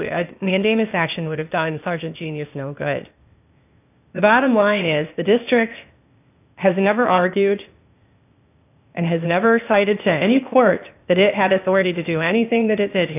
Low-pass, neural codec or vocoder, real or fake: 3.6 kHz; codec, 16 kHz, 0.8 kbps, ZipCodec; fake